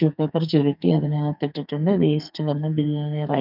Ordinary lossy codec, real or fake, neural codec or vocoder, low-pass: none; fake; codec, 44.1 kHz, 2.6 kbps, SNAC; 5.4 kHz